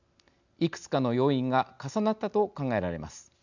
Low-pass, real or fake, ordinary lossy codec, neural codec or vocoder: 7.2 kHz; real; none; none